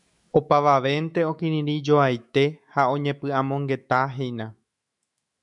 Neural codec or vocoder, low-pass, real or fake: autoencoder, 48 kHz, 128 numbers a frame, DAC-VAE, trained on Japanese speech; 10.8 kHz; fake